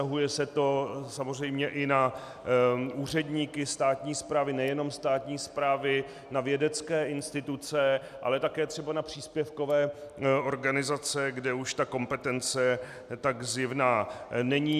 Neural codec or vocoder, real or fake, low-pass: none; real; 14.4 kHz